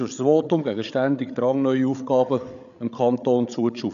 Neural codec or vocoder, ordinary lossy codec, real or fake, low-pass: codec, 16 kHz, 8 kbps, FreqCodec, larger model; none; fake; 7.2 kHz